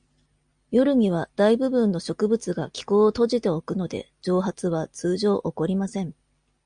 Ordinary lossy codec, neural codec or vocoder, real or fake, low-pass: Opus, 64 kbps; none; real; 9.9 kHz